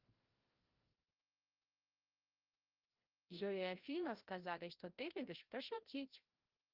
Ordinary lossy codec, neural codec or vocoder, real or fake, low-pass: Opus, 32 kbps; codec, 16 kHz, 0.5 kbps, FreqCodec, larger model; fake; 5.4 kHz